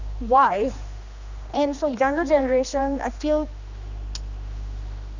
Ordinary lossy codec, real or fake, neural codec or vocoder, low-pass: none; fake; codec, 16 kHz, 2 kbps, X-Codec, HuBERT features, trained on general audio; 7.2 kHz